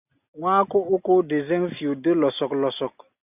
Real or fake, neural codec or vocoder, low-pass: real; none; 3.6 kHz